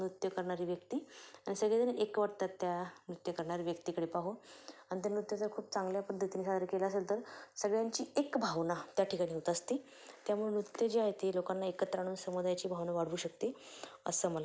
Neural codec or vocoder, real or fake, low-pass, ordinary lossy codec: none; real; none; none